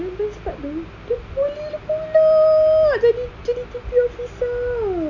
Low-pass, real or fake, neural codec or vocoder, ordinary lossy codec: 7.2 kHz; real; none; none